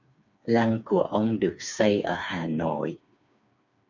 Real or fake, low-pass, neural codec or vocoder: fake; 7.2 kHz; codec, 16 kHz, 4 kbps, FreqCodec, smaller model